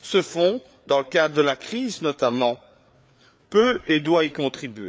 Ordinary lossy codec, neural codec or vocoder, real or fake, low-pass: none; codec, 16 kHz, 4 kbps, FreqCodec, larger model; fake; none